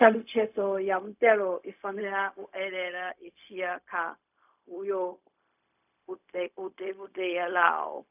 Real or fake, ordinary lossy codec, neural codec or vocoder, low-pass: fake; none; codec, 16 kHz, 0.4 kbps, LongCat-Audio-Codec; 3.6 kHz